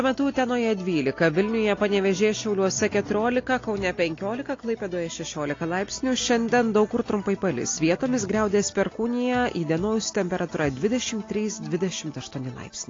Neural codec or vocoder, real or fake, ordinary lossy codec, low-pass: none; real; AAC, 32 kbps; 7.2 kHz